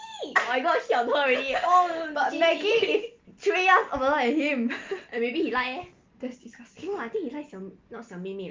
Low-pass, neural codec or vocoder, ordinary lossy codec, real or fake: 7.2 kHz; none; Opus, 32 kbps; real